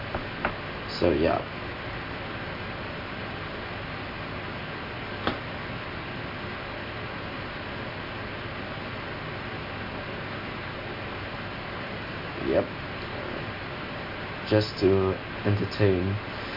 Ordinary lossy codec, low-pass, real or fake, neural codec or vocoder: MP3, 32 kbps; 5.4 kHz; real; none